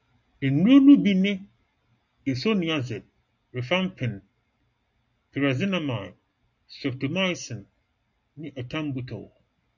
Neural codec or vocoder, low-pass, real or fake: none; 7.2 kHz; real